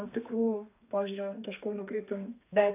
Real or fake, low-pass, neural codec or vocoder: fake; 3.6 kHz; codec, 32 kHz, 1.9 kbps, SNAC